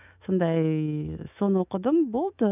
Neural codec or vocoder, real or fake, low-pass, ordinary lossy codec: autoencoder, 48 kHz, 128 numbers a frame, DAC-VAE, trained on Japanese speech; fake; 3.6 kHz; none